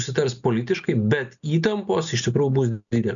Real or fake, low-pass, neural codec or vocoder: real; 7.2 kHz; none